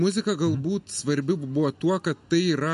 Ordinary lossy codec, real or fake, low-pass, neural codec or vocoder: MP3, 48 kbps; real; 14.4 kHz; none